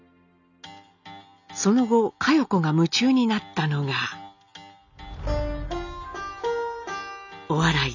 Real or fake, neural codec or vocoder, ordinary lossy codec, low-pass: real; none; none; 7.2 kHz